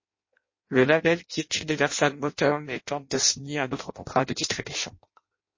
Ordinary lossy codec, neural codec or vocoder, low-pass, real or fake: MP3, 32 kbps; codec, 16 kHz in and 24 kHz out, 0.6 kbps, FireRedTTS-2 codec; 7.2 kHz; fake